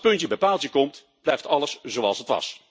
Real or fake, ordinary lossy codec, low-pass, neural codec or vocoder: real; none; none; none